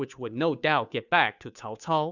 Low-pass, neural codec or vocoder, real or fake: 7.2 kHz; codec, 16 kHz, 2 kbps, FunCodec, trained on Chinese and English, 25 frames a second; fake